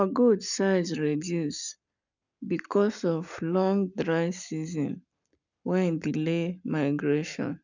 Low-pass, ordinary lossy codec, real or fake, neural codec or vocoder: 7.2 kHz; none; fake; codec, 44.1 kHz, 7.8 kbps, Pupu-Codec